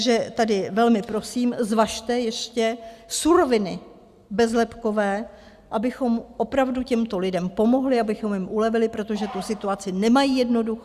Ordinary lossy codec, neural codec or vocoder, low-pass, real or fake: Opus, 64 kbps; none; 14.4 kHz; real